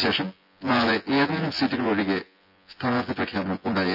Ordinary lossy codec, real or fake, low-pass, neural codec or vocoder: none; fake; 5.4 kHz; vocoder, 24 kHz, 100 mel bands, Vocos